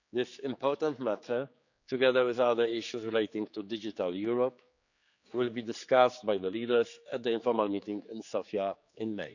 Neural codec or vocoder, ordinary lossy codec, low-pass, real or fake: codec, 16 kHz, 4 kbps, X-Codec, HuBERT features, trained on general audio; none; 7.2 kHz; fake